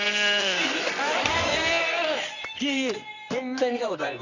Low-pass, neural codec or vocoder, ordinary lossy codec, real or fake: 7.2 kHz; codec, 24 kHz, 0.9 kbps, WavTokenizer, medium music audio release; none; fake